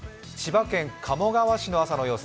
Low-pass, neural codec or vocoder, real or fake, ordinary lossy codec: none; none; real; none